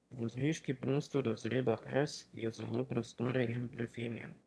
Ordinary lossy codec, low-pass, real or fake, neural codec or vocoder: none; none; fake; autoencoder, 22.05 kHz, a latent of 192 numbers a frame, VITS, trained on one speaker